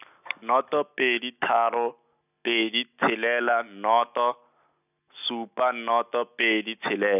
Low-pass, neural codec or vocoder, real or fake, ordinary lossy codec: 3.6 kHz; none; real; none